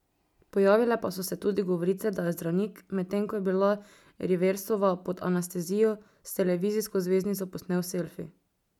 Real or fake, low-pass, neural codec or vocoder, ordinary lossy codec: real; 19.8 kHz; none; none